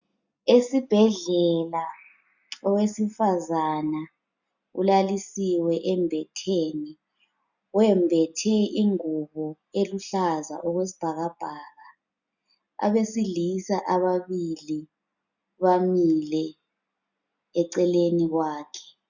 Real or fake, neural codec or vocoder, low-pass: real; none; 7.2 kHz